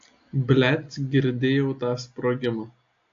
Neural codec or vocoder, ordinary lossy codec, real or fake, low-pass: none; AAC, 48 kbps; real; 7.2 kHz